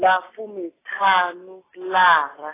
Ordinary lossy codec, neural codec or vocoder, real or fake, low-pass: AAC, 16 kbps; none; real; 3.6 kHz